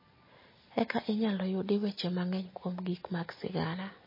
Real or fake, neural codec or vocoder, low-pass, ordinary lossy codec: real; none; 5.4 kHz; MP3, 24 kbps